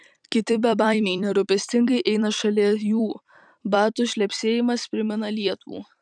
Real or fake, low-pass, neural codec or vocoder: fake; 9.9 kHz; vocoder, 44.1 kHz, 128 mel bands every 256 samples, BigVGAN v2